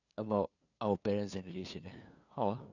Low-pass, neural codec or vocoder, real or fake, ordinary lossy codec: 7.2 kHz; codec, 16 kHz, 2 kbps, FunCodec, trained on LibriTTS, 25 frames a second; fake; none